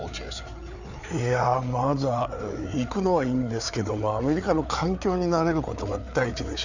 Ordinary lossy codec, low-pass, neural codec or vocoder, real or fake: none; 7.2 kHz; codec, 16 kHz, 4 kbps, FreqCodec, larger model; fake